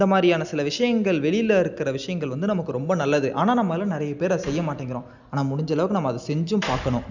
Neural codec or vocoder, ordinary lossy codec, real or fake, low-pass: none; none; real; 7.2 kHz